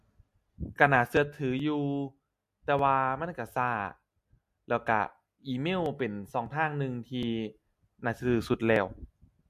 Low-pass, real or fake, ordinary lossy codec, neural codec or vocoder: 14.4 kHz; real; MP3, 64 kbps; none